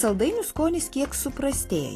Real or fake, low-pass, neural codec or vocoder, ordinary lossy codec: real; 14.4 kHz; none; AAC, 48 kbps